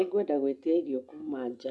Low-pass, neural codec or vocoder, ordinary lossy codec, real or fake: none; none; none; real